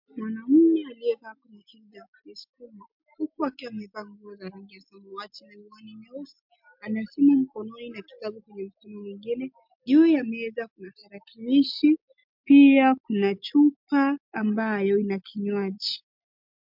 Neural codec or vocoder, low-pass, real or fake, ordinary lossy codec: none; 5.4 kHz; real; MP3, 48 kbps